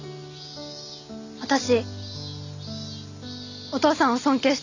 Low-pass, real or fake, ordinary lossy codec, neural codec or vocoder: 7.2 kHz; real; none; none